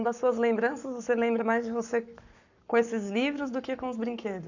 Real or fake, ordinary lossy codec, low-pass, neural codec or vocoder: fake; none; 7.2 kHz; codec, 44.1 kHz, 7.8 kbps, Pupu-Codec